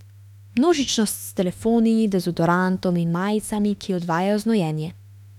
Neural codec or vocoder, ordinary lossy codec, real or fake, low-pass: autoencoder, 48 kHz, 32 numbers a frame, DAC-VAE, trained on Japanese speech; none; fake; 19.8 kHz